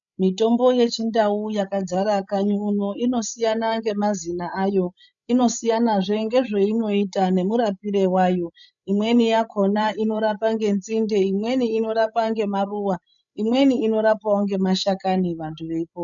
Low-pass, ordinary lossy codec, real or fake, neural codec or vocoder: 7.2 kHz; MP3, 96 kbps; fake; codec, 16 kHz, 16 kbps, FreqCodec, larger model